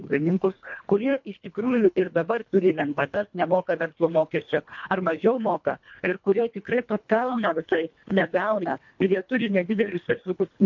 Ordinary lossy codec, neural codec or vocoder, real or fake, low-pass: MP3, 48 kbps; codec, 24 kHz, 1.5 kbps, HILCodec; fake; 7.2 kHz